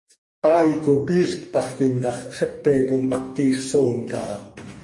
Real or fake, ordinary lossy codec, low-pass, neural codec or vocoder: fake; MP3, 48 kbps; 10.8 kHz; codec, 44.1 kHz, 2.6 kbps, DAC